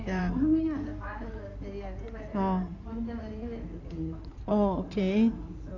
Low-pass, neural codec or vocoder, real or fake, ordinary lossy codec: 7.2 kHz; codec, 16 kHz in and 24 kHz out, 2.2 kbps, FireRedTTS-2 codec; fake; none